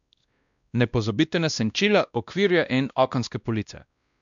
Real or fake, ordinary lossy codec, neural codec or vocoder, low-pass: fake; none; codec, 16 kHz, 1 kbps, X-Codec, WavLM features, trained on Multilingual LibriSpeech; 7.2 kHz